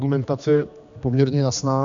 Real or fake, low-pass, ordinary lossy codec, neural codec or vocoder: fake; 7.2 kHz; MP3, 64 kbps; codec, 16 kHz, 2 kbps, X-Codec, HuBERT features, trained on general audio